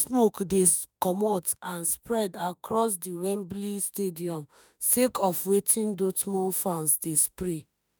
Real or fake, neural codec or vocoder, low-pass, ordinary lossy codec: fake; autoencoder, 48 kHz, 32 numbers a frame, DAC-VAE, trained on Japanese speech; none; none